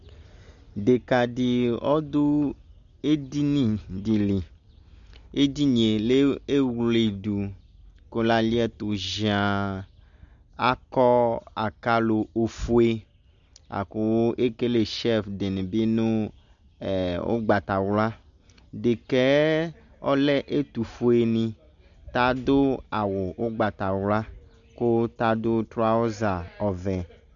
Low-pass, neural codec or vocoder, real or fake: 7.2 kHz; none; real